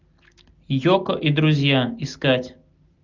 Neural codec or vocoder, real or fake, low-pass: none; real; 7.2 kHz